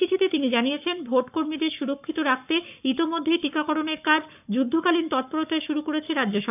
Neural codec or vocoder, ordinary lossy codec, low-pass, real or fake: codec, 44.1 kHz, 7.8 kbps, Pupu-Codec; none; 3.6 kHz; fake